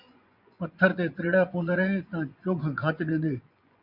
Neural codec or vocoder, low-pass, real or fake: vocoder, 24 kHz, 100 mel bands, Vocos; 5.4 kHz; fake